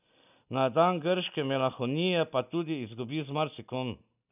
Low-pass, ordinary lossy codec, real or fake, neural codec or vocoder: 3.6 kHz; none; real; none